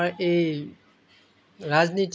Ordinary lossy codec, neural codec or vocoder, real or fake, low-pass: none; none; real; none